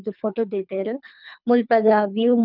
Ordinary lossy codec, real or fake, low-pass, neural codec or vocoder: none; fake; 5.4 kHz; codec, 24 kHz, 3 kbps, HILCodec